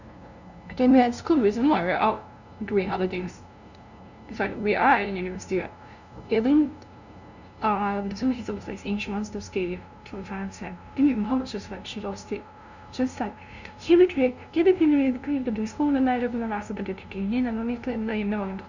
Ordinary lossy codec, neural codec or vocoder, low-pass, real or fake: none; codec, 16 kHz, 0.5 kbps, FunCodec, trained on LibriTTS, 25 frames a second; 7.2 kHz; fake